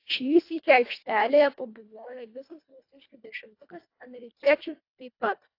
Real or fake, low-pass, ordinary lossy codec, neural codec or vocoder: fake; 5.4 kHz; AAC, 32 kbps; codec, 24 kHz, 1.5 kbps, HILCodec